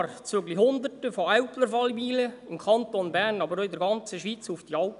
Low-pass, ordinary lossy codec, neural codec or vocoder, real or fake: 10.8 kHz; none; none; real